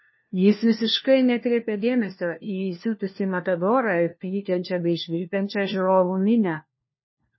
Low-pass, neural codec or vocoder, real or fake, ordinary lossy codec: 7.2 kHz; codec, 16 kHz, 1 kbps, FunCodec, trained on LibriTTS, 50 frames a second; fake; MP3, 24 kbps